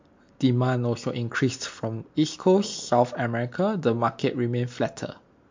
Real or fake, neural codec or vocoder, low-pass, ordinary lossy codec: real; none; 7.2 kHz; MP3, 48 kbps